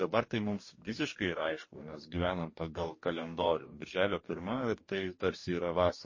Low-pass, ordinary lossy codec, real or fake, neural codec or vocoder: 7.2 kHz; MP3, 32 kbps; fake; codec, 44.1 kHz, 2.6 kbps, DAC